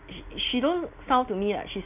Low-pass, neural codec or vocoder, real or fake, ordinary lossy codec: 3.6 kHz; none; real; none